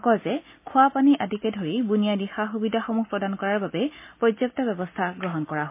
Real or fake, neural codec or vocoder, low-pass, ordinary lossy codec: real; none; 3.6 kHz; MP3, 24 kbps